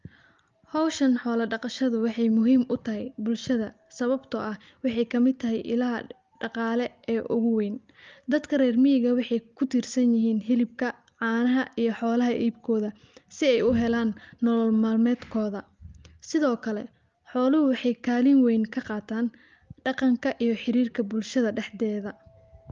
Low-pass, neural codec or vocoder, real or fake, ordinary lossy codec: 7.2 kHz; none; real; Opus, 32 kbps